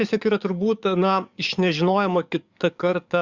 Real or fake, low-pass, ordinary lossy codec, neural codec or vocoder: fake; 7.2 kHz; Opus, 64 kbps; codec, 44.1 kHz, 7.8 kbps, DAC